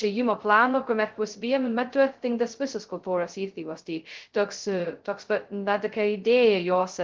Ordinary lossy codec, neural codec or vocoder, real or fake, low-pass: Opus, 16 kbps; codec, 16 kHz, 0.2 kbps, FocalCodec; fake; 7.2 kHz